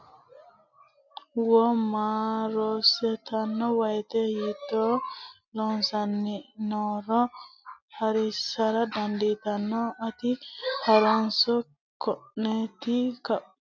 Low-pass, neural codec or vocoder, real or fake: 7.2 kHz; none; real